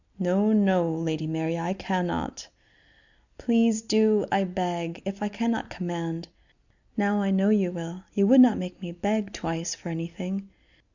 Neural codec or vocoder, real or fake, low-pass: none; real; 7.2 kHz